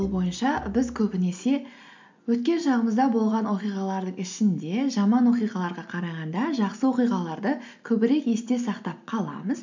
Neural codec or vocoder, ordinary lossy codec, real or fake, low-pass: none; MP3, 64 kbps; real; 7.2 kHz